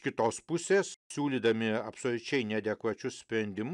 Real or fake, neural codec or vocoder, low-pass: real; none; 10.8 kHz